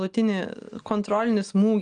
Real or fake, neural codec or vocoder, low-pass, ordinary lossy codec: real; none; 9.9 kHz; Opus, 32 kbps